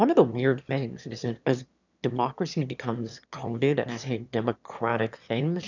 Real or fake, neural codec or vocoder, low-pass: fake; autoencoder, 22.05 kHz, a latent of 192 numbers a frame, VITS, trained on one speaker; 7.2 kHz